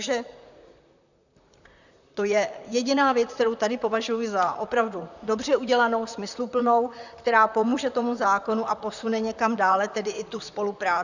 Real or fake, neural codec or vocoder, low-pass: fake; vocoder, 44.1 kHz, 128 mel bands, Pupu-Vocoder; 7.2 kHz